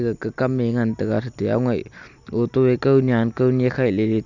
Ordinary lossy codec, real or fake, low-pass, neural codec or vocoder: none; real; 7.2 kHz; none